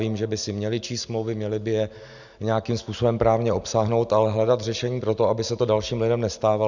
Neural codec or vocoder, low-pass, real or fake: none; 7.2 kHz; real